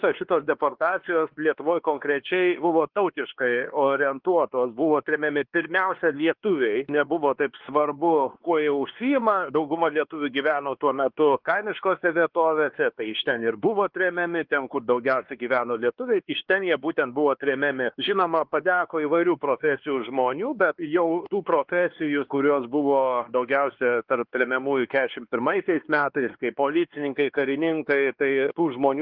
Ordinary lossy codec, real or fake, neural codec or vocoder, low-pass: Opus, 16 kbps; fake; codec, 16 kHz, 2 kbps, X-Codec, WavLM features, trained on Multilingual LibriSpeech; 5.4 kHz